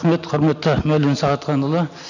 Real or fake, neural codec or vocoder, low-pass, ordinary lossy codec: real; none; 7.2 kHz; none